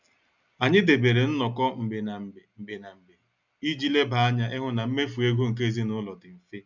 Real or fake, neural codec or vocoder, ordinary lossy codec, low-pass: real; none; none; 7.2 kHz